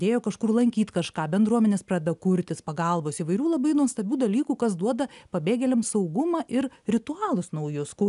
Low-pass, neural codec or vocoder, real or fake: 10.8 kHz; none; real